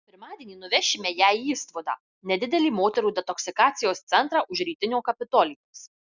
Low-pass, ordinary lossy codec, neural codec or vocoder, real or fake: 7.2 kHz; Opus, 64 kbps; none; real